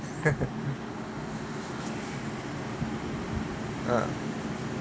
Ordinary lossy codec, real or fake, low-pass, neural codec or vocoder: none; real; none; none